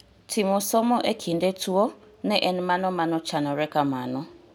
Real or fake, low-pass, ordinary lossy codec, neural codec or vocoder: real; none; none; none